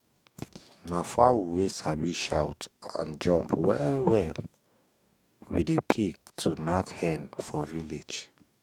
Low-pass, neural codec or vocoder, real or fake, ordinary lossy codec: 19.8 kHz; codec, 44.1 kHz, 2.6 kbps, DAC; fake; none